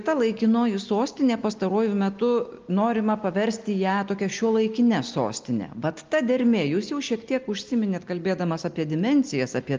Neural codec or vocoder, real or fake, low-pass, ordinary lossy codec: none; real; 7.2 kHz; Opus, 24 kbps